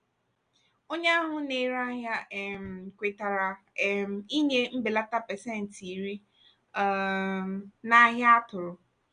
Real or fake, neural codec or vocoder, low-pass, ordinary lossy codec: real; none; 9.9 kHz; none